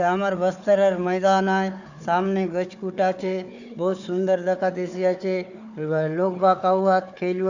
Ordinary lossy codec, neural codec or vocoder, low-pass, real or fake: none; codec, 16 kHz, 4 kbps, FunCodec, trained on Chinese and English, 50 frames a second; 7.2 kHz; fake